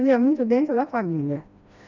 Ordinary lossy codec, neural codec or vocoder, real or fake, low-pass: none; codec, 16 kHz, 1 kbps, FreqCodec, smaller model; fake; 7.2 kHz